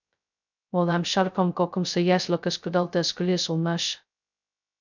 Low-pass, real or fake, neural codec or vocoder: 7.2 kHz; fake; codec, 16 kHz, 0.2 kbps, FocalCodec